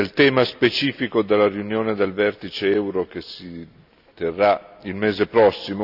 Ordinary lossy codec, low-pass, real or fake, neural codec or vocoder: none; 5.4 kHz; real; none